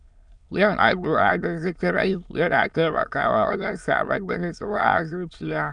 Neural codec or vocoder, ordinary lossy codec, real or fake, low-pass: autoencoder, 22.05 kHz, a latent of 192 numbers a frame, VITS, trained on many speakers; none; fake; 9.9 kHz